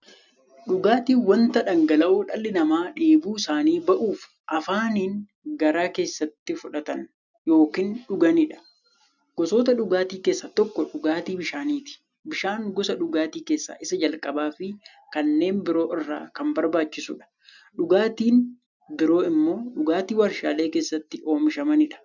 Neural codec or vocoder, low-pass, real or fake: none; 7.2 kHz; real